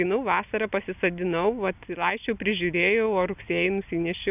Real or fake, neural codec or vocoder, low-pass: real; none; 3.6 kHz